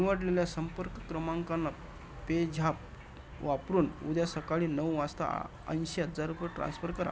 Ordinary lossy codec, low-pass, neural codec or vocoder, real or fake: none; none; none; real